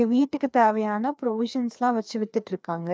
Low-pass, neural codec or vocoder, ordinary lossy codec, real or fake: none; codec, 16 kHz, 2 kbps, FreqCodec, larger model; none; fake